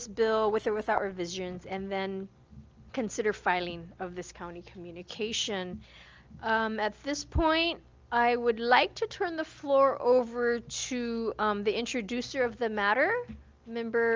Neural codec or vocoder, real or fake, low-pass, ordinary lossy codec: none; real; 7.2 kHz; Opus, 24 kbps